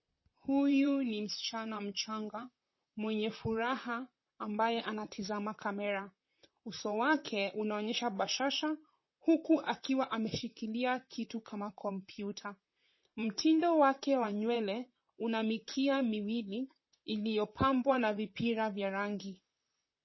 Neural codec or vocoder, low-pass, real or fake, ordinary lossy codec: vocoder, 44.1 kHz, 128 mel bands, Pupu-Vocoder; 7.2 kHz; fake; MP3, 24 kbps